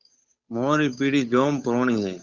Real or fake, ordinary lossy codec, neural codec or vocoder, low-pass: fake; Opus, 64 kbps; codec, 16 kHz, 8 kbps, FunCodec, trained on Chinese and English, 25 frames a second; 7.2 kHz